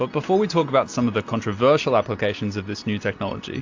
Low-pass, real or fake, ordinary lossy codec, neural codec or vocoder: 7.2 kHz; real; AAC, 48 kbps; none